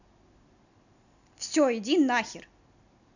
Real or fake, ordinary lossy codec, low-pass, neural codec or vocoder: real; none; 7.2 kHz; none